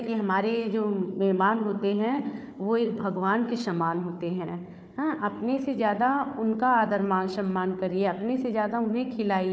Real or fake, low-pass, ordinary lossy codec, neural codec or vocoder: fake; none; none; codec, 16 kHz, 4 kbps, FunCodec, trained on Chinese and English, 50 frames a second